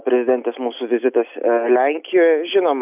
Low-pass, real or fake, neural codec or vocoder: 3.6 kHz; real; none